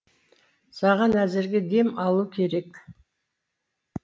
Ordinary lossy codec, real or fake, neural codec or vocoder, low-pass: none; real; none; none